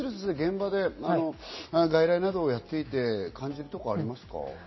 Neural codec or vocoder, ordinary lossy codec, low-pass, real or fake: none; MP3, 24 kbps; 7.2 kHz; real